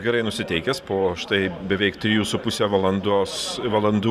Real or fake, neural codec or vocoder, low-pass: fake; vocoder, 44.1 kHz, 128 mel bands every 512 samples, BigVGAN v2; 14.4 kHz